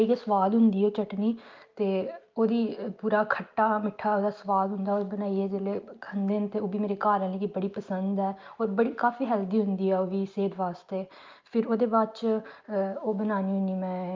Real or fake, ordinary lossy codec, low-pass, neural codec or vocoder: real; Opus, 24 kbps; 7.2 kHz; none